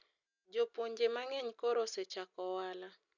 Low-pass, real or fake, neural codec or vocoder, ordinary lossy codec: 7.2 kHz; real; none; none